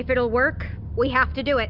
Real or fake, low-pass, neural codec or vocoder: real; 5.4 kHz; none